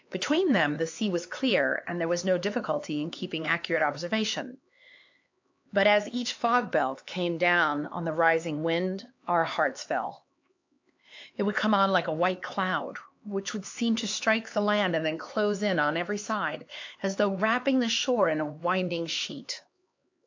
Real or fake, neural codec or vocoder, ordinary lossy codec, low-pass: fake; codec, 16 kHz, 4 kbps, X-Codec, HuBERT features, trained on LibriSpeech; AAC, 48 kbps; 7.2 kHz